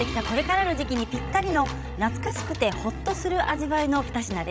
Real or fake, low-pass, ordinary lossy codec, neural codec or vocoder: fake; none; none; codec, 16 kHz, 16 kbps, FreqCodec, larger model